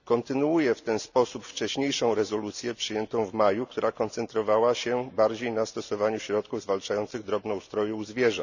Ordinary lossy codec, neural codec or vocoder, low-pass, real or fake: none; none; 7.2 kHz; real